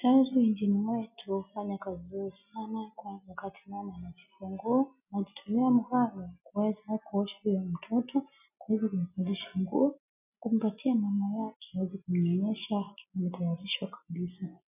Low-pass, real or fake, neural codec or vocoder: 3.6 kHz; real; none